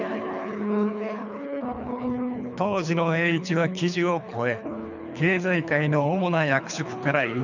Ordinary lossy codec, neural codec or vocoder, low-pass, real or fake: none; codec, 24 kHz, 3 kbps, HILCodec; 7.2 kHz; fake